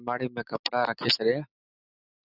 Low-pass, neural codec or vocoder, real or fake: 5.4 kHz; none; real